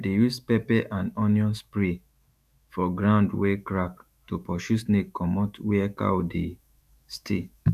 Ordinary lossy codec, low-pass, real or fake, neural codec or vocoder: none; 14.4 kHz; fake; autoencoder, 48 kHz, 128 numbers a frame, DAC-VAE, trained on Japanese speech